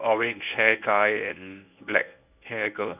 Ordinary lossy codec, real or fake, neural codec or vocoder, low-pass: none; fake; codec, 24 kHz, 0.9 kbps, WavTokenizer, medium speech release version 1; 3.6 kHz